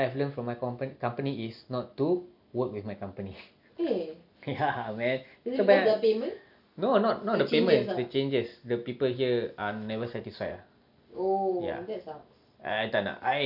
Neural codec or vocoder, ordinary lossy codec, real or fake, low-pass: none; none; real; 5.4 kHz